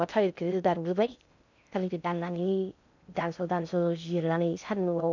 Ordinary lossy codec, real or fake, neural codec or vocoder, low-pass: none; fake; codec, 16 kHz in and 24 kHz out, 0.6 kbps, FocalCodec, streaming, 2048 codes; 7.2 kHz